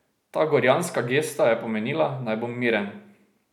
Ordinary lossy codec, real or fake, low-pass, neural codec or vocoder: none; real; 19.8 kHz; none